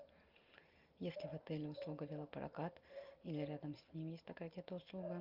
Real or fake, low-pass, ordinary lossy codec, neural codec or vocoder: real; 5.4 kHz; Opus, 24 kbps; none